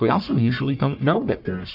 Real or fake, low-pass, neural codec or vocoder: fake; 5.4 kHz; codec, 44.1 kHz, 1.7 kbps, Pupu-Codec